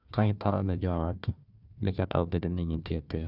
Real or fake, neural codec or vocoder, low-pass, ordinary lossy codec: fake; codec, 16 kHz, 1 kbps, FunCodec, trained on Chinese and English, 50 frames a second; 5.4 kHz; none